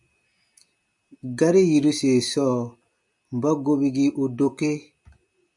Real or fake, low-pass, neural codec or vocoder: real; 10.8 kHz; none